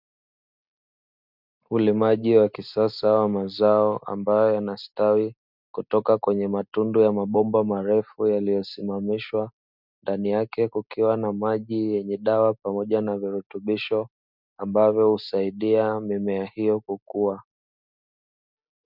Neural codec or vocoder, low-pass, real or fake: none; 5.4 kHz; real